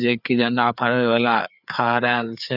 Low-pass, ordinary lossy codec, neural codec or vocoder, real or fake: 5.4 kHz; none; codec, 16 kHz, 4 kbps, FreqCodec, larger model; fake